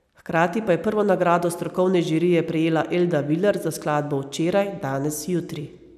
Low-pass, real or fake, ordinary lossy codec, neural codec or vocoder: 14.4 kHz; real; none; none